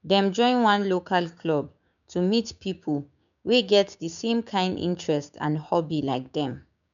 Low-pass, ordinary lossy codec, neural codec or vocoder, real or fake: 7.2 kHz; none; codec, 16 kHz, 6 kbps, DAC; fake